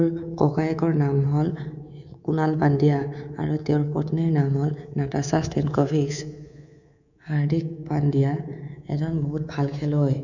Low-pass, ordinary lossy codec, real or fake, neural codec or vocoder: 7.2 kHz; none; fake; codec, 24 kHz, 3.1 kbps, DualCodec